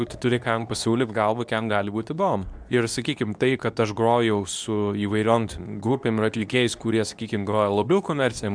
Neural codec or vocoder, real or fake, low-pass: codec, 24 kHz, 0.9 kbps, WavTokenizer, medium speech release version 2; fake; 9.9 kHz